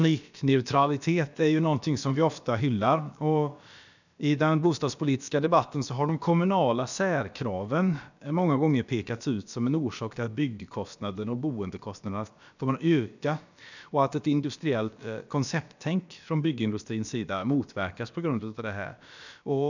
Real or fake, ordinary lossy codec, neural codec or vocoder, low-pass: fake; none; codec, 16 kHz, about 1 kbps, DyCAST, with the encoder's durations; 7.2 kHz